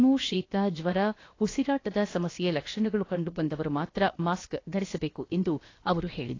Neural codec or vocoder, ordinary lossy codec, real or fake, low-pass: codec, 16 kHz, about 1 kbps, DyCAST, with the encoder's durations; AAC, 32 kbps; fake; 7.2 kHz